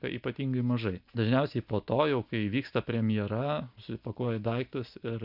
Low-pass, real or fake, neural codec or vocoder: 5.4 kHz; real; none